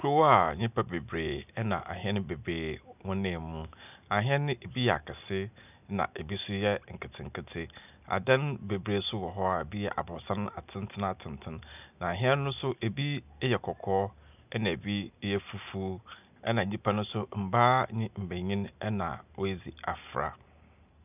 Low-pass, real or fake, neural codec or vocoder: 3.6 kHz; real; none